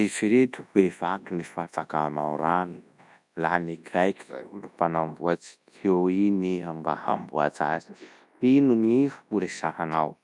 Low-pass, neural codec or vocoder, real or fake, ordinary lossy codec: 10.8 kHz; codec, 24 kHz, 0.9 kbps, WavTokenizer, large speech release; fake; none